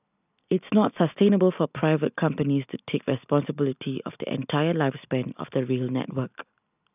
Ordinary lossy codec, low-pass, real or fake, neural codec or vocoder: none; 3.6 kHz; real; none